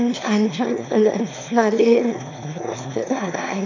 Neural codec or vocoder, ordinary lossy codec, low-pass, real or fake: autoencoder, 22.05 kHz, a latent of 192 numbers a frame, VITS, trained on one speaker; MP3, 48 kbps; 7.2 kHz; fake